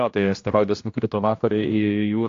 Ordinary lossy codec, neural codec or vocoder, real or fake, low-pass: AAC, 48 kbps; codec, 16 kHz, 1 kbps, X-Codec, HuBERT features, trained on general audio; fake; 7.2 kHz